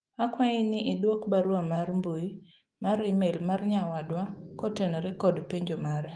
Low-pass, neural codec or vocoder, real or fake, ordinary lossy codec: 9.9 kHz; autoencoder, 48 kHz, 128 numbers a frame, DAC-VAE, trained on Japanese speech; fake; Opus, 24 kbps